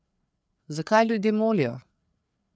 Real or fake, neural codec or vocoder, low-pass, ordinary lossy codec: fake; codec, 16 kHz, 4 kbps, FreqCodec, larger model; none; none